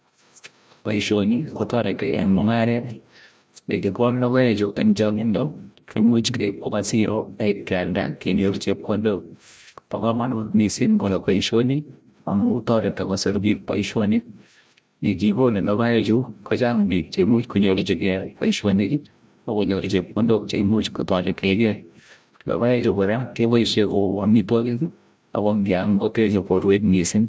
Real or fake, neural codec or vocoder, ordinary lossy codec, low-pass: fake; codec, 16 kHz, 0.5 kbps, FreqCodec, larger model; none; none